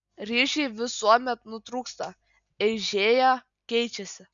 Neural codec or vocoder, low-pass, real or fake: none; 7.2 kHz; real